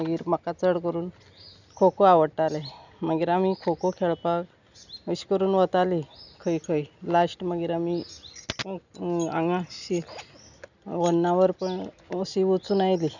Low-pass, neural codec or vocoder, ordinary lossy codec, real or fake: 7.2 kHz; none; none; real